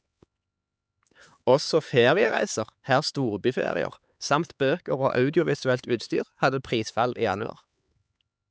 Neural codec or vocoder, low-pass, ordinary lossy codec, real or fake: codec, 16 kHz, 4 kbps, X-Codec, HuBERT features, trained on LibriSpeech; none; none; fake